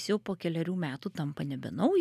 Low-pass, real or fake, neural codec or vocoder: 14.4 kHz; real; none